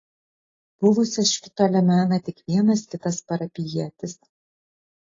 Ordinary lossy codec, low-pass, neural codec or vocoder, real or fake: AAC, 32 kbps; 7.2 kHz; none; real